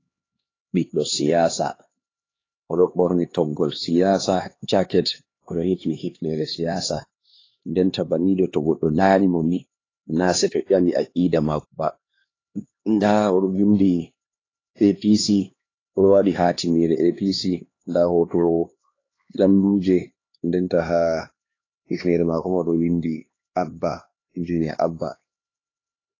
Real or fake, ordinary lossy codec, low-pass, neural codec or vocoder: fake; AAC, 32 kbps; 7.2 kHz; codec, 16 kHz, 2 kbps, X-Codec, HuBERT features, trained on LibriSpeech